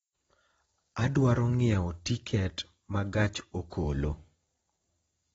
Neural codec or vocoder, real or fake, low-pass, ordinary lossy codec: vocoder, 44.1 kHz, 128 mel bands every 256 samples, BigVGAN v2; fake; 19.8 kHz; AAC, 24 kbps